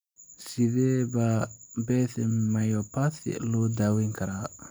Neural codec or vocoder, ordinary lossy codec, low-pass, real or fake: none; none; none; real